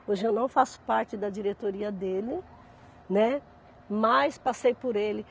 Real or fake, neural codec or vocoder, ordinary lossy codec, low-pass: real; none; none; none